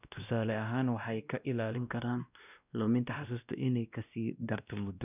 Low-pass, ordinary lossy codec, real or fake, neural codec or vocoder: 3.6 kHz; none; fake; codec, 16 kHz, 1 kbps, X-Codec, WavLM features, trained on Multilingual LibriSpeech